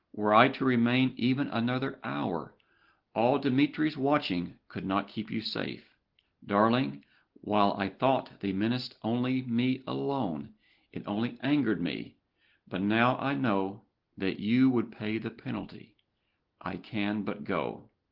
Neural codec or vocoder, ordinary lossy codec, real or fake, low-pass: none; Opus, 16 kbps; real; 5.4 kHz